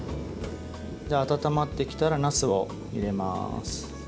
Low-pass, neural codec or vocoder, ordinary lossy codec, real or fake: none; none; none; real